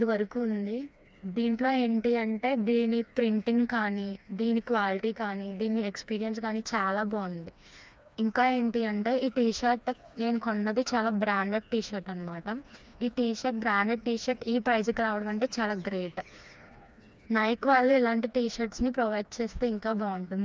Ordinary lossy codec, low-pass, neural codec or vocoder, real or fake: none; none; codec, 16 kHz, 2 kbps, FreqCodec, smaller model; fake